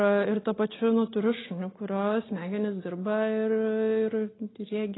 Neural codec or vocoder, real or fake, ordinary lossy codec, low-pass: none; real; AAC, 16 kbps; 7.2 kHz